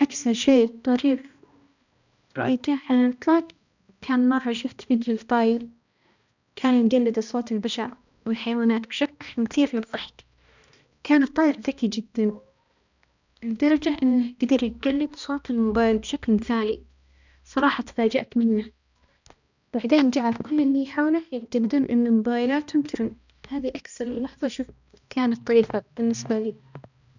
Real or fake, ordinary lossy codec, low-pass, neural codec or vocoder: fake; none; 7.2 kHz; codec, 16 kHz, 1 kbps, X-Codec, HuBERT features, trained on balanced general audio